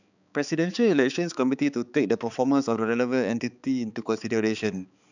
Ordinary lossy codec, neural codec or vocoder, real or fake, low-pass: none; codec, 16 kHz, 4 kbps, X-Codec, HuBERT features, trained on balanced general audio; fake; 7.2 kHz